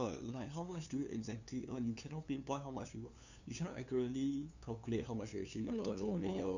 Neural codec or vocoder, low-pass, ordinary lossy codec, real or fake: codec, 16 kHz, 2 kbps, FunCodec, trained on LibriTTS, 25 frames a second; 7.2 kHz; none; fake